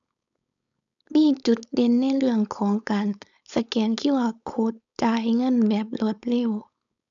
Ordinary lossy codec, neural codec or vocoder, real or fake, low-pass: none; codec, 16 kHz, 4.8 kbps, FACodec; fake; 7.2 kHz